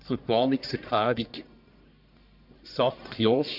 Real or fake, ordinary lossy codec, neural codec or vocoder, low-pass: fake; none; codec, 44.1 kHz, 1.7 kbps, Pupu-Codec; 5.4 kHz